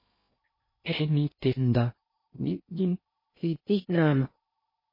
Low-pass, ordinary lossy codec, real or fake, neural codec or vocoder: 5.4 kHz; MP3, 24 kbps; fake; codec, 16 kHz in and 24 kHz out, 0.6 kbps, FocalCodec, streaming, 2048 codes